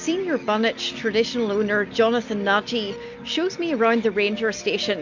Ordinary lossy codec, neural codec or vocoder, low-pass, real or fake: MP3, 64 kbps; none; 7.2 kHz; real